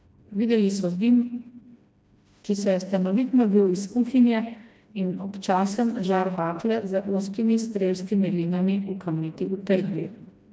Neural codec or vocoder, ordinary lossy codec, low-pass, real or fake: codec, 16 kHz, 1 kbps, FreqCodec, smaller model; none; none; fake